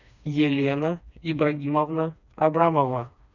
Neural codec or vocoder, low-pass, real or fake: codec, 16 kHz, 2 kbps, FreqCodec, smaller model; 7.2 kHz; fake